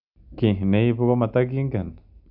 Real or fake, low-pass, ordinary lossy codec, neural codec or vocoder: real; 5.4 kHz; none; none